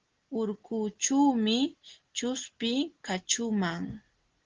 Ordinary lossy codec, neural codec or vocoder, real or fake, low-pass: Opus, 16 kbps; none; real; 7.2 kHz